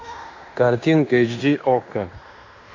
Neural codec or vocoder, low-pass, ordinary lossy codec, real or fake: codec, 16 kHz in and 24 kHz out, 0.9 kbps, LongCat-Audio-Codec, fine tuned four codebook decoder; 7.2 kHz; AAC, 48 kbps; fake